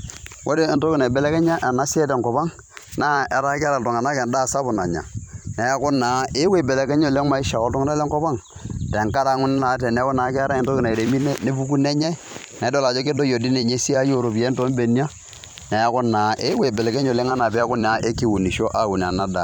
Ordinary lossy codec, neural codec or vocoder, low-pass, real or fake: none; vocoder, 44.1 kHz, 128 mel bands every 256 samples, BigVGAN v2; 19.8 kHz; fake